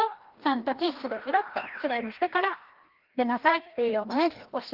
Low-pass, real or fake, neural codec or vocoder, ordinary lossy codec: 5.4 kHz; fake; codec, 16 kHz, 1 kbps, FreqCodec, larger model; Opus, 16 kbps